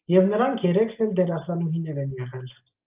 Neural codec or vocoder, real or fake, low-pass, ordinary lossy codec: none; real; 3.6 kHz; Opus, 24 kbps